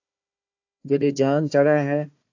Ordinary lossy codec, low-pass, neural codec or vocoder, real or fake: AAC, 48 kbps; 7.2 kHz; codec, 16 kHz, 1 kbps, FunCodec, trained on Chinese and English, 50 frames a second; fake